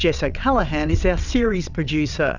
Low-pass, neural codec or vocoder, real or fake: 7.2 kHz; vocoder, 22.05 kHz, 80 mel bands, WaveNeXt; fake